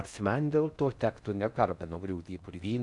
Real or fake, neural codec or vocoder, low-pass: fake; codec, 16 kHz in and 24 kHz out, 0.6 kbps, FocalCodec, streaming, 4096 codes; 10.8 kHz